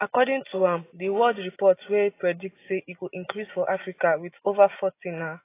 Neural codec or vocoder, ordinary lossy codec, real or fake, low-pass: none; AAC, 24 kbps; real; 3.6 kHz